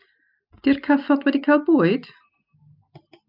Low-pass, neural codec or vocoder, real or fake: 5.4 kHz; none; real